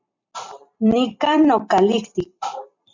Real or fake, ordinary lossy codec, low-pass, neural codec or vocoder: fake; MP3, 64 kbps; 7.2 kHz; vocoder, 44.1 kHz, 128 mel bands every 256 samples, BigVGAN v2